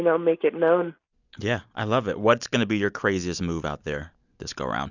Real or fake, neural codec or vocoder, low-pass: real; none; 7.2 kHz